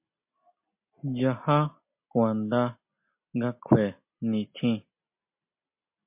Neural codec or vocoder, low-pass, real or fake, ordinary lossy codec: none; 3.6 kHz; real; MP3, 32 kbps